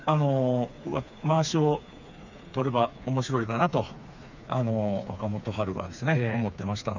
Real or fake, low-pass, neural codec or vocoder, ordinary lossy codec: fake; 7.2 kHz; codec, 16 kHz, 4 kbps, FreqCodec, smaller model; none